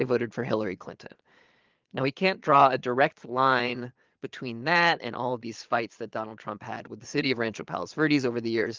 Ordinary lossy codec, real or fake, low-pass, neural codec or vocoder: Opus, 32 kbps; fake; 7.2 kHz; vocoder, 22.05 kHz, 80 mel bands, Vocos